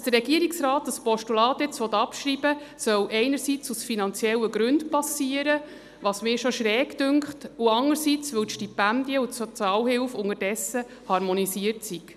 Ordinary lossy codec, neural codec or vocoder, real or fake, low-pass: none; none; real; 14.4 kHz